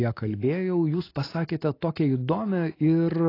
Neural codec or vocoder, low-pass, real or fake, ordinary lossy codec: none; 5.4 kHz; real; AAC, 24 kbps